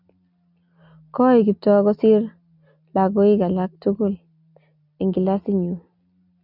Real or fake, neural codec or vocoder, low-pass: real; none; 5.4 kHz